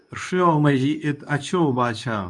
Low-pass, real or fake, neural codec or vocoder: 10.8 kHz; fake; codec, 24 kHz, 0.9 kbps, WavTokenizer, medium speech release version 2